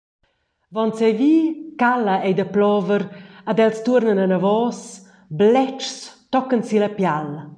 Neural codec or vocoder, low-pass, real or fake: none; 9.9 kHz; real